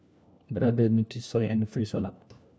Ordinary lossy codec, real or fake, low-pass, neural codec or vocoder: none; fake; none; codec, 16 kHz, 1 kbps, FunCodec, trained on LibriTTS, 50 frames a second